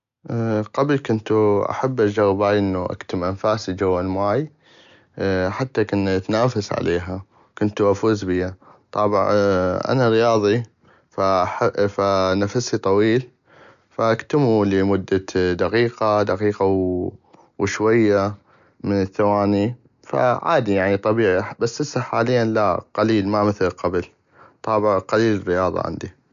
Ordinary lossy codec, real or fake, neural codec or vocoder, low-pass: none; real; none; 7.2 kHz